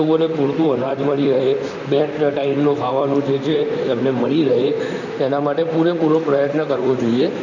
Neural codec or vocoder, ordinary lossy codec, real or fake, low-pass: vocoder, 44.1 kHz, 128 mel bands, Pupu-Vocoder; none; fake; 7.2 kHz